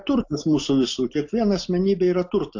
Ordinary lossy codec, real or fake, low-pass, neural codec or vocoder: AAC, 48 kbps; real; 7.2 kHz; none